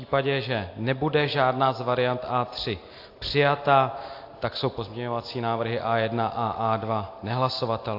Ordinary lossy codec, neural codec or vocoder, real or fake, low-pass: AAC, 32 kbps; none; real; 5.4 kHz